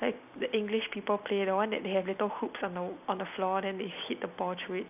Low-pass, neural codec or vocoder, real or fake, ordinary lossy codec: 3.6 kHz; none; real; none